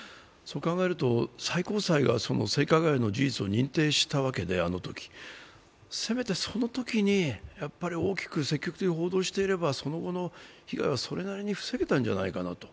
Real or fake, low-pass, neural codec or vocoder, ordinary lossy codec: real; none; none; none